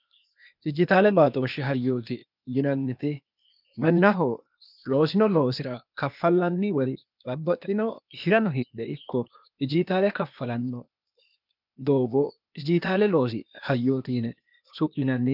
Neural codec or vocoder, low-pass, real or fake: codec, 16 kHz, 0.8 kbps, ZipCodec; 5.4 kHz; fake